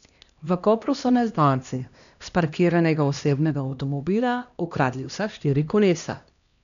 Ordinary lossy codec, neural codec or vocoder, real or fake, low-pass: none; codec, 16 kHz, 1 kbps, X-Codec, HuBERT features, trained on LibriSpeech; fake; 7.2 kHz